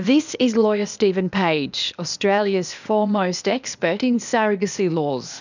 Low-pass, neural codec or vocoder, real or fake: 7.2 kHz; codec, 16 kHz, 0.8 kbps, ZipCodec; fake